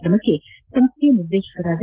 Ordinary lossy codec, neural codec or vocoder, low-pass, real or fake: Opus, 16 kbps; none; 3.6 kHz; real